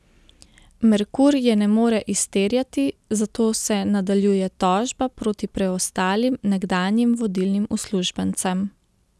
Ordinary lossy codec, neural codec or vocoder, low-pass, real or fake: none; none; none; real